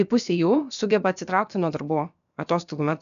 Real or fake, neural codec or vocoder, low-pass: fake; codec, 16 kHz, about 1 kbps, DyCAST, with the encoder's durations; 7.2 kHz